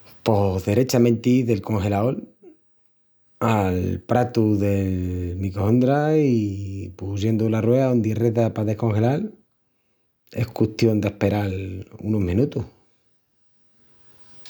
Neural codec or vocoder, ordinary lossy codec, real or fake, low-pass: none; none; real; none